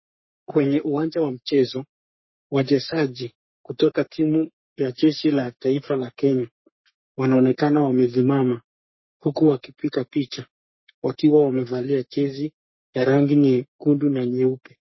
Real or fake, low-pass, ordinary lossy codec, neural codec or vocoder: fake; 7.2 kHz; MP3, 24 kbps; codec, 44.1 kHz, 3.4 kbps, Pupu-Codec